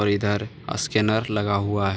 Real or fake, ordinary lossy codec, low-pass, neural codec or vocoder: real; none; none; none